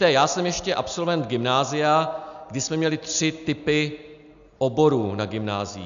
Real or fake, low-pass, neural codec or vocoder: real; 7.2 kHz; none